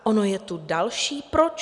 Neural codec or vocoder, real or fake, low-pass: none; real; 10.8 kHz